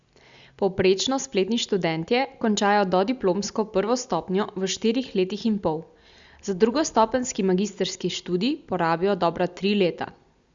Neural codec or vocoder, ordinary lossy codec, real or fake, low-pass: none; Opus, 64 kbps; real; 7.2 kHz